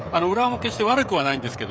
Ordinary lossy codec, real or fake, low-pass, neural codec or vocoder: none; fake; none; codec, 16 kHz, 16 kbps, FreqCodec, smaller model